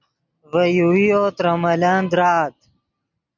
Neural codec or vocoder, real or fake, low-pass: none; real; 7.2 kHz